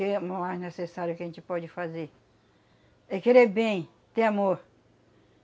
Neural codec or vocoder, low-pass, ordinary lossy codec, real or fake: none; none; none; real